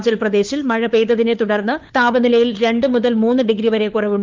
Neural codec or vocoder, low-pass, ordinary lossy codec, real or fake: codec, 16 kHz, 4 kbps, FunCodec, trained on LibriTTS, 50 frames a second; 7.2 kHz; Opus, 32 kbps; fake